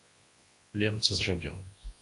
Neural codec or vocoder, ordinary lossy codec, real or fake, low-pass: codec, 24 kHz, 0.9 kbps, WavTokenizer, large speech release; AAC, 48 kbps; fake; 10.8 kHz